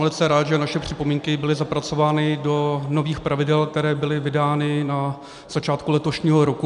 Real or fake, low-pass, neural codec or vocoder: real; 10.8 kHz; none